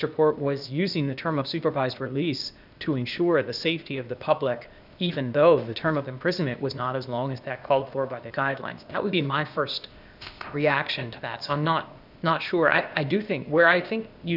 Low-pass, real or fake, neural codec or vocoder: 5.4 kHz; fake; codec, 16 kHz, 0.8 kbps, ZipCodec